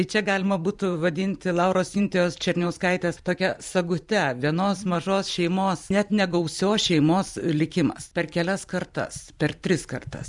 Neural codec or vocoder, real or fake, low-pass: vocoder, 44.1 kHz, 128 mel bands every 512 samples, BigVGAN v2; fake; 10.8 kHz